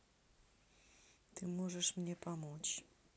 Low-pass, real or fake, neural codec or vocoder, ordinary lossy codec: none; real; none; none